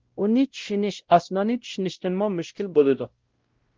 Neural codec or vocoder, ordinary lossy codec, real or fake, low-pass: codec, 16 kHz, 0.5 kbps, X-Codec, WavLM features, trained on Multilingual LibriSpeech; Opus, 16 kbps; fake; 7.2 kHz